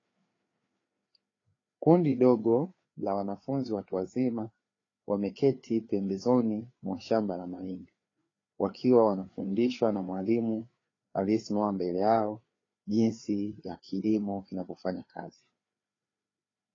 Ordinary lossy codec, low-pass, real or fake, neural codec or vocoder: AAC, 32 kbps; 7.2 kHz; fake; codec, 16 kHz, 4 kbps, FreqCodec, larger model